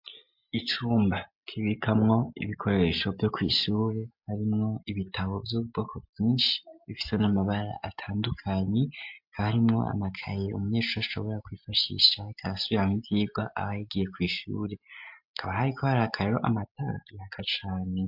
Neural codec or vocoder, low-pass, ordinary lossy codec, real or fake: none; 5.4 kHz; MP3, 48 kbps; real